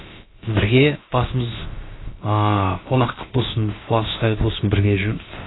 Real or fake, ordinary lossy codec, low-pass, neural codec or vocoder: fake; AAC, 16 kbps; 7.2 kHz; codec, 16 kHz, about 1 kbps, DyCAST, with the encoder's durations